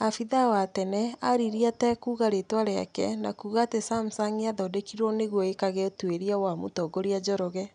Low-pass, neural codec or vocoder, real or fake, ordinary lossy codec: 9.9 kHz; none; real; none